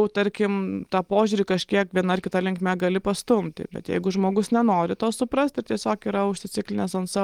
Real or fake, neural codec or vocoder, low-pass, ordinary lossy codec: real; none; 19.8 kHz; Opus, 24 kbps